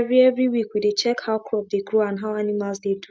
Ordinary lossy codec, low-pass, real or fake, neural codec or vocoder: none; none; real; none